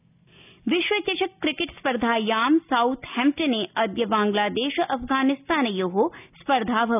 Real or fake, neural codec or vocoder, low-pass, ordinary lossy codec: real; none; 3.6 kHz; none